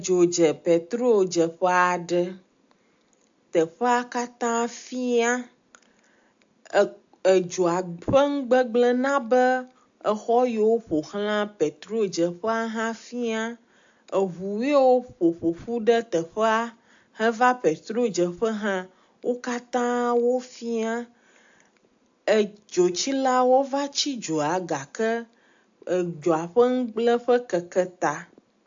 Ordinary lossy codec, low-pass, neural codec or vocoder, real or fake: AAC, 64 kbps; 7.2 kHz; none; real